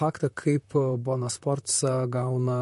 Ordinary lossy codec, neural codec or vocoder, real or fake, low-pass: MP3, 48 kbps; vocoder, 44.1 kHz, 128 mel bands, Pupu-Vocoder; fake; 14.4 kHz